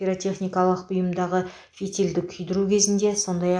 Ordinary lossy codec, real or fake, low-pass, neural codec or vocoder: AAC, 64 kbps; real; 9.9 kHz; none